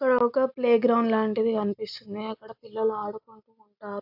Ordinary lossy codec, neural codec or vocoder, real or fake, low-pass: AAC, 48 kbps; none; real; 5.4 kHz